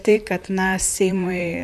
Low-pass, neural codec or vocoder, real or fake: 14.4 kHz; vocoder, 44.1 kHz, 128 mel bands, Pupu-Vocoder; fake